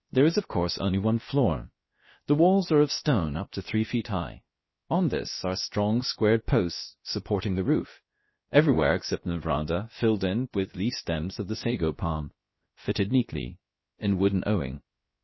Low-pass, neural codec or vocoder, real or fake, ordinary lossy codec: 7.2 kHz; codec, 16 kHz, about 1 kbps, DyCAST, with the encoder's durations; fake; MP3, 24 kbps